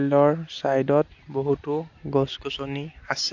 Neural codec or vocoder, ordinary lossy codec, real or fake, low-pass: none; AAC, 48 kbps; real; 7.2 kHz